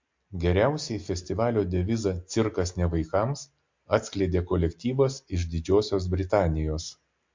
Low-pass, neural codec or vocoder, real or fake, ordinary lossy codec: 7.2 kHz; none; real; MP3, 48 kbps